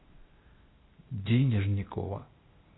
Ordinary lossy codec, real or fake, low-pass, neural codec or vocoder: AAC, 16 kbps; fake; 7.2 kHz; codec, 16 kHz, 0.8 kbps, ZipCodec